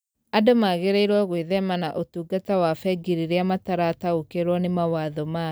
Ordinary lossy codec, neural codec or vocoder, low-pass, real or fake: none; none; none; real